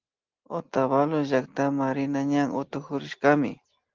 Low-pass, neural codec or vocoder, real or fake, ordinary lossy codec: 7.2 kHz; none; real; Opus, 16 kbps